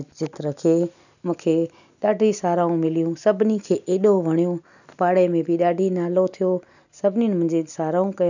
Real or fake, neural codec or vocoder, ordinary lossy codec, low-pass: real; none; none; 7.2 kHz